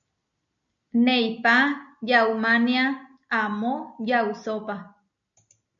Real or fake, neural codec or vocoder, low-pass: real; none; 7.2 kHz